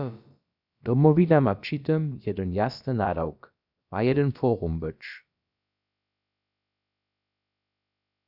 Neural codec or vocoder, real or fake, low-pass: codec, 16 kHz, about 1 kbps, DyCAST, with the encoder's durations; fake; 5.4 kHz